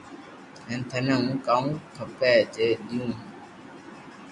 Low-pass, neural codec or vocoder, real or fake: 10.8 kHz; none; real